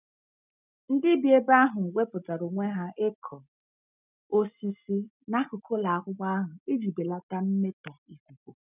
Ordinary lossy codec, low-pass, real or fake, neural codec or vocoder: none; 3.6 kHz; real; none